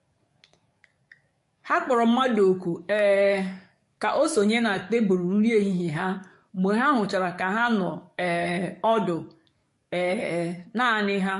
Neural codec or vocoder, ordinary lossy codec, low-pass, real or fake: codec, 44.1 kHz, 7.8 kbps, DAC; MP3, 48 kbps; 14.4 kHz; fake